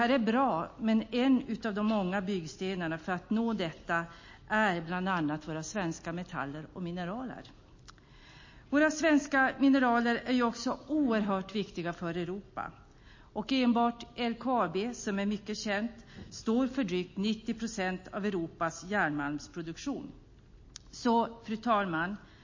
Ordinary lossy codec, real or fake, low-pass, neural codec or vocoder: MP3, 32 kbps; real; 7.2 kHz; none